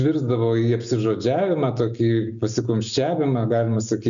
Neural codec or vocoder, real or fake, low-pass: none; real; 7.2 kHz